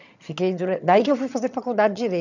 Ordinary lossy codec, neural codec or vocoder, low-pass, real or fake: none; vocoder, 22.05 kHz, 80 mel bands, HiFi-GAN; 7.2 kHz; fake